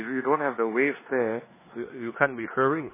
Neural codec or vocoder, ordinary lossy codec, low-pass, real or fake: codec, 16 kHz in and 24 kHz out, 0.9 kbps, LongCat-Audio-Codec, four codebook decoder; MP3, 16 kbps; 3.6 kHz; fake